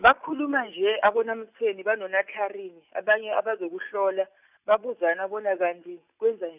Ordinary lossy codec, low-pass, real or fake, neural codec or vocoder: none; 3.6 kHz; real; none